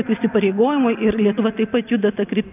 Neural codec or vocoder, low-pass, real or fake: none; 3.6 kHz; real